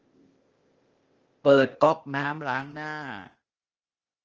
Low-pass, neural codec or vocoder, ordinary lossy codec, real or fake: 7.2 kHz; codec, 16 kHz, 0.8 kbps, ZipCodec; Opus, 24 kbps; fake